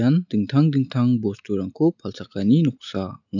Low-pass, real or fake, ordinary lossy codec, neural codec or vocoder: 7.2 kHz; real; none; none